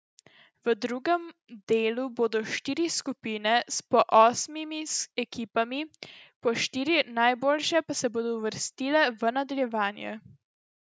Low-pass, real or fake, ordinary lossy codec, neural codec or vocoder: none; real; none; none